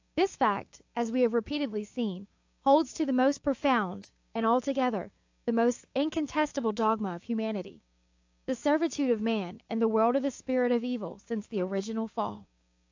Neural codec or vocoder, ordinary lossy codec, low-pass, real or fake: codec, 16 kHz, 6 kbps, DAC; AAC, 48 kbps; 7.2 kHz; fake